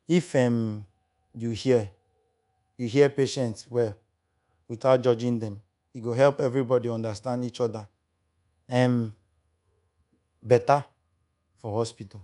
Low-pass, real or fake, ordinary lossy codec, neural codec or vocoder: 10.8 kHz; fake; none; codec, 24 kHz, 1.2 kbps, DualCodec